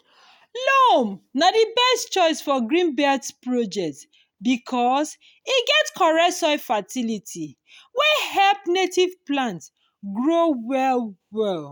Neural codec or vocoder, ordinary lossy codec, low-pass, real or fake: none; none; none; real